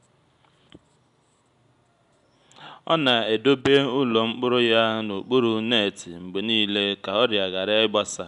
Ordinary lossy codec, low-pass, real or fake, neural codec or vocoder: none; 10.8 kHz; real; none